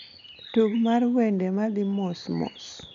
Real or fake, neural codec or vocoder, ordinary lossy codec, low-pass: real; none; MP3, 64 kbps; 7.2 kHz